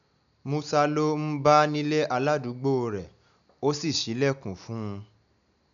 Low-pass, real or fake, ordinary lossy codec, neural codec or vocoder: 7.2 kHz; real; none; none